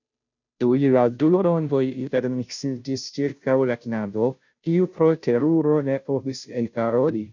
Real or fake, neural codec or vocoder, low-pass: fake; codec, 16 kHz, 0.5 kbps, FunCodec, trained on Chinese and English, 25 frames a second; 7.2 kHz